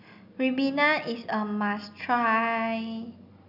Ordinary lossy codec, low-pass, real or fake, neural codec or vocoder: none; 5.4 kHz; real; none